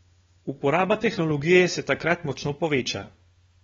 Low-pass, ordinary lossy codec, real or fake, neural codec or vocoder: 7.2 kHz; AAC, 24 kbps; fake; codec, 16 kHz, 4 kbps, FunCodec, trained on LibriTTS, 50 frames a second